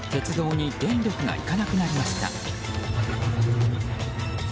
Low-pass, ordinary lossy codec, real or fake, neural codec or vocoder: none; none; real; none